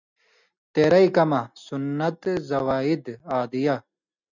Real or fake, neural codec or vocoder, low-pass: real; none; 7.2 kHz